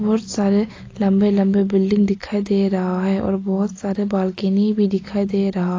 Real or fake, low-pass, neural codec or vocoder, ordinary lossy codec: real; 7.2 kHz; none; AAC, 32 kbps